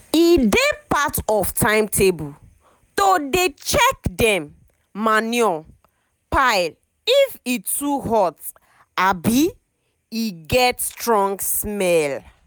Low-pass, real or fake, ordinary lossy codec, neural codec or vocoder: none; real; none; none